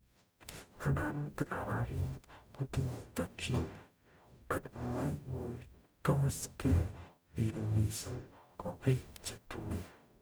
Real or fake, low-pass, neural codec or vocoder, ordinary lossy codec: fake; none; codec, 44.1 kHz, 0.9 kbps, DAC; none